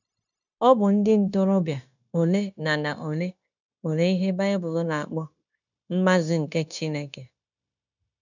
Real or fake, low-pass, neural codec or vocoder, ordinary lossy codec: fake; 7.2 kHz; codec, 16 kHz, 0.9 kbps, LongCat-Audio-Codec; none